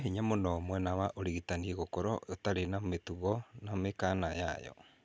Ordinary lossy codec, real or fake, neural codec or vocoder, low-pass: none; real; none; none